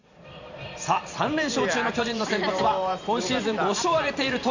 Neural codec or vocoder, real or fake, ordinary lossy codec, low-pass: vocoder, 44.1 kHz, 128 mel bands every 512 samples, BigVGAN v2; fake; none; 7.2 kHz